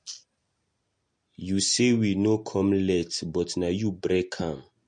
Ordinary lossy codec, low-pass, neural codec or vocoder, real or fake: MP3, 48 kbps; 9.9 kHz; none; real